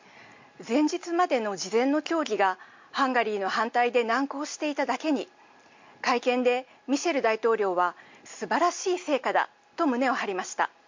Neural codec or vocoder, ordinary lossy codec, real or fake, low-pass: none; MP3, 48 kbps; real; 7.2 kHz